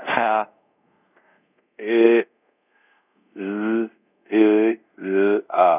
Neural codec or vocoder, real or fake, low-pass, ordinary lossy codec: codec, 24 kHz, 0.5 kbps, DualCodec; fake; 3.6 kHz; none